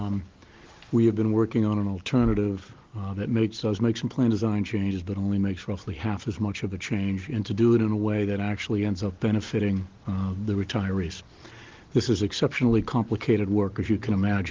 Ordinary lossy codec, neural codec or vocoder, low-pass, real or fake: Opus, 16 kbps; none; 7.2 kHz; real